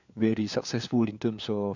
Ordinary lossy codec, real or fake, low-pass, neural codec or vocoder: none; fake; 7.2 kHz; codec, 16 kHz, 4 kbps, FunCodec, trained on LibriTTS, 50 frames a second